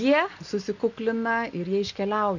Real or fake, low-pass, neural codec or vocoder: real; 7.2 kHz; none